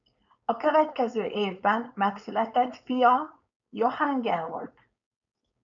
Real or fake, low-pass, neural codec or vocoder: fake; 7.2 kHz; codec, 16 kHz, 4.8 kbps, FACodec